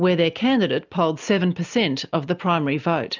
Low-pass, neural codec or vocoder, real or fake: 7.2 kHz; none; real